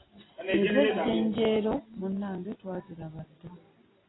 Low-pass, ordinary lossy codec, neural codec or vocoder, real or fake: 7.2 kHz; AAC, 16 kbps; none; real